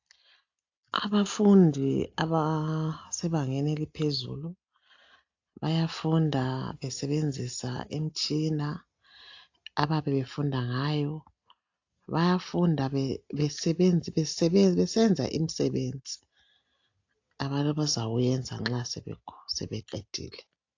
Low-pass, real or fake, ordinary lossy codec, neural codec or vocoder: 7.2 kHz; real; AAC, 48 kbps; none